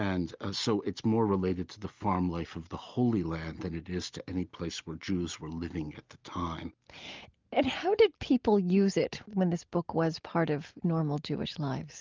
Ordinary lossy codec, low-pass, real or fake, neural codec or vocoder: Opus, 24 kbps; 7.2 kHz; real; none